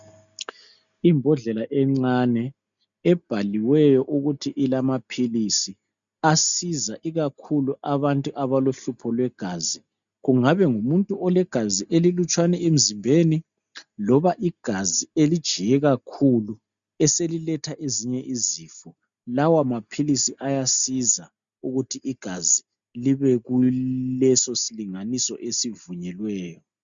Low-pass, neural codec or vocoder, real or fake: 7.2 kHz; none; real